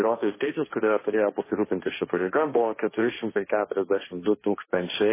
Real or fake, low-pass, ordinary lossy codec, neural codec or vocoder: fake; 3.6 kHz; MP3, 16 kbps; codec, 16 kHz, 1.1 kbps, Voila-Tokenizer